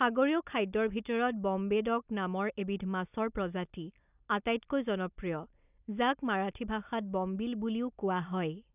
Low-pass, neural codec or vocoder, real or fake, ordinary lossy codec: 3.6 kHz; none; real; none